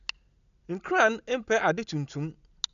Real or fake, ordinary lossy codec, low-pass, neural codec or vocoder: real; none; 7.2 kHz; none